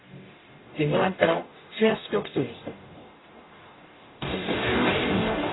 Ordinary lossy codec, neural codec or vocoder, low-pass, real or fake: AAC, 16 kbps; codec, 44.1 kHz, 0.9 kbps, DAC; 7.2 kHz; fake